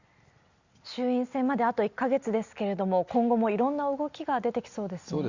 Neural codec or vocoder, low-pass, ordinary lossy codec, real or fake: none; 7.2 kHz; Opus, 64 kbps; real